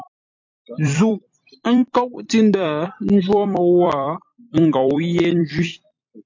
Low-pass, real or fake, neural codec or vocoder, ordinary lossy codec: 7.2 kHz; fake; vocoder, 44.1 kHz, 128 mel bands every 256 samples, BigVGAN v2; MP3, 48 kbps